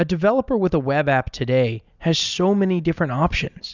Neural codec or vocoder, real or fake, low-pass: none; real; 7.2 kHz